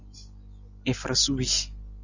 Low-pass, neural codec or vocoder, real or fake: 7.2 kHz; none; real